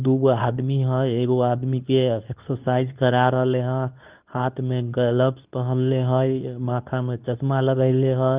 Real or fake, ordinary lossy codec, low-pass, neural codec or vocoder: fake; Opus, 24 kbps; 3.6 kHz; codec, 24 kHz, 0.9 kbps, WavTokenizer, medium speech release version 2